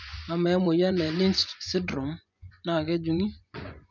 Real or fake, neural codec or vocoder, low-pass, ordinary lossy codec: fake; vocoder, 24 kHz, 100 mel bands, Vocos; 7.2 kHz; none